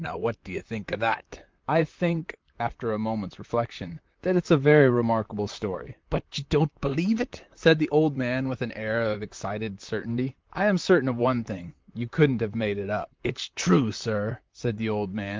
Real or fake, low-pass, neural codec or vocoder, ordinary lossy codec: fake; 7.2 kHz; vocoder, 44.1 kHz, 128 mel bands, Pupu-Vocoder; Opus, 32 kbps